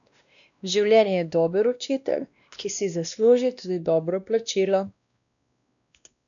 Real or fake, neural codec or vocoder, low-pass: fake; codec, 16 kHz, 1 kbps, X-Codec, WavLM features, trained on Multilingual LibriSpeech; 7.2 kHz